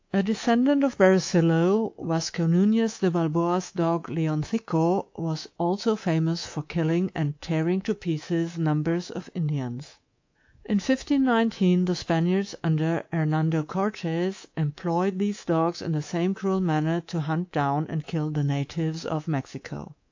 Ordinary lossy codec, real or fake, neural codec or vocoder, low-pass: AAC, 48 kbps; fake; codec, 24 kHz, 3.1 kbps, DualCodec; 7.2 kHz